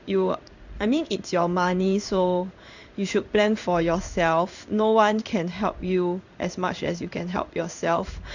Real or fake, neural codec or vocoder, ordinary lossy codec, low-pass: fake; codec, 16 kHz in and 24 kHz out, 1 kbps, XY-Tokenizer; none; 7.2 kHz